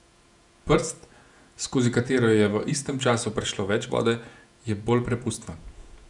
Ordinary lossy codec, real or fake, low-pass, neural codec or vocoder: none; fake; 10.8 kHz; vocoder, 48 kHz, 128 mel bands, Vocos